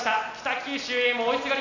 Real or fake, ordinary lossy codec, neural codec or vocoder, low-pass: real; none; none; 7.2 kHz